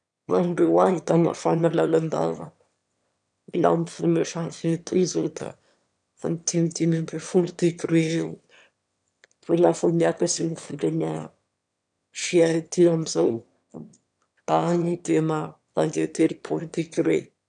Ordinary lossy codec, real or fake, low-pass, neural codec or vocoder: none; fake; 9.9 kHz; autoencoder, 22.05 kHz, a latent of 192 numbers a frame, VITS, trained on one speaker